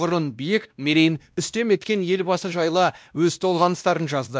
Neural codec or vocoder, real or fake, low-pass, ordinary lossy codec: codec, 16 kHz, 1 kbps, X-Codec, WavLM features, trained on Multilingual LibriSpeech; fake; none; none